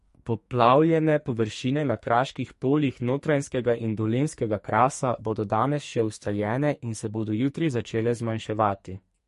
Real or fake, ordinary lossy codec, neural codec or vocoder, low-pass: fake; MP3, 48 kbps; codec, 44.1 kHz, 2.6 kbps, SNAC; 14.4 kHz